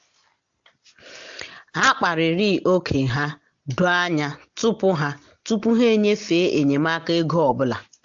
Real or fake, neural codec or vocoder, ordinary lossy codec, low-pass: real; none; AAC, 64 kbps; 7.2 kHz